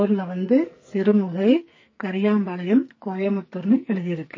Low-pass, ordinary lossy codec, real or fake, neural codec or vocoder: 7.2 kHz; MP3, 32 kbps; fake; codec, 44.1 kHz, 2.6 kbps, SNAC